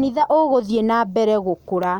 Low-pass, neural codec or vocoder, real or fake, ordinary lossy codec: 19.8 kHz; none; real; none